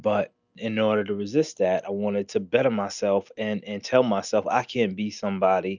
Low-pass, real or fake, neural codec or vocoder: 7.2 kHz; real; none